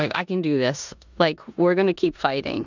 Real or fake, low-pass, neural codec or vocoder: fake; 7.2 kHz; codec, 16 kHz in and 24 kHz out, 0.9 kbps, LongCat-Audio-Codec, four codebook decoder